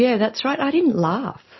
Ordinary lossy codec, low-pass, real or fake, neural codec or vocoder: MP3, 24 kbps; 7.2 kHz; real; none